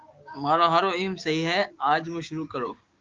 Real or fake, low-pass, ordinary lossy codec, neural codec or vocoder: fake; 7.2 kHz; Opus, 24 kbps; codec, 16 kHz, 6 kbps, DAC